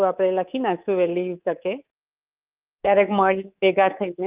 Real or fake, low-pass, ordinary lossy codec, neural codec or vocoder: fake; 3.6 kHz; Opus, 24 kbps; codec, 24 kHz, 3.1 kbps, DualCodec